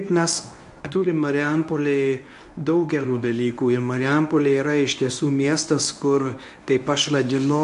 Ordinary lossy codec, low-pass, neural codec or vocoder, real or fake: AAC, 96 kbps; 10.8 kHz; codec, 24 kHz, 0.9 kbps, WavTokenizer, medium speech release version 1; fake